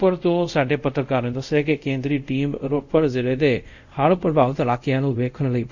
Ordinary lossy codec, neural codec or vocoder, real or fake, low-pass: none; codec, 24 kHz, 0.5 kbps, DualCodec; fake; 7.2 kHz